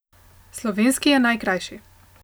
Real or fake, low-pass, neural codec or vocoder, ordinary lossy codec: real; none; none; none